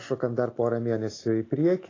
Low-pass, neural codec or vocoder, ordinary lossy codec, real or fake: 7.2 kHz; none; AAC, 32 kbps; real